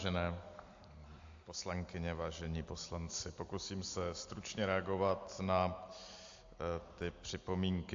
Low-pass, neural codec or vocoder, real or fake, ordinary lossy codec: 7.2 kHz; none; real; AAC, 48 kbps